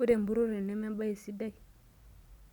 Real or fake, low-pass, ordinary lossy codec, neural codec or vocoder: real; 19.8 kHz; none; none